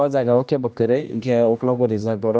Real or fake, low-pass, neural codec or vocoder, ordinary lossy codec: fake; none; codec, 16 kHz, 1 kbps, X-Codec, HuBERT features, trained on general audio; none